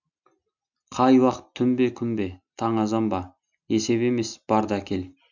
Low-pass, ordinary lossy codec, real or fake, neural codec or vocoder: 7.2 kHz; none; real; none